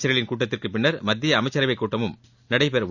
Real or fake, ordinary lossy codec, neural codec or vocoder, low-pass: real; none; none; 7.2 kHz